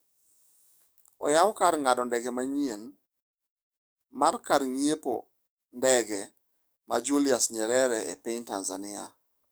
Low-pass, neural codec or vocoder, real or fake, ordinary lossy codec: none; codec, 44.1 kHz, 7.8 kbps, DAC; fake; none